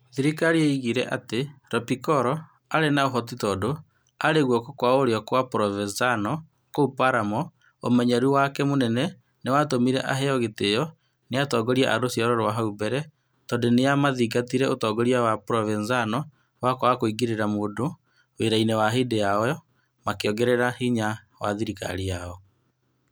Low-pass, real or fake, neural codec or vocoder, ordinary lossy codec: none; real; none; none